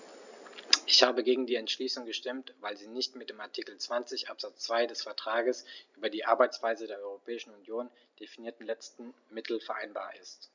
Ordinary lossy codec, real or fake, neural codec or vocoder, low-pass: none; real; none; 7.2 kHz